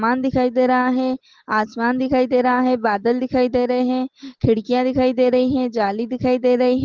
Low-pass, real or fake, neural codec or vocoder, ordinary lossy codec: 7.2 kHz; real; none; Opus, 16 kbps